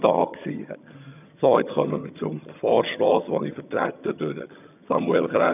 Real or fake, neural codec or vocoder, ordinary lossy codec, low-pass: fake; vocoder, 22.05 kHz, 80 mel bands, HiFi-GAN; none; 3.6 kHz